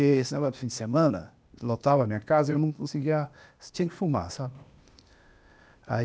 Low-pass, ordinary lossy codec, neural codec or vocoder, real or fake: none; none; codec, 16 kHz, 0.8 kbps, ZipCodec; fake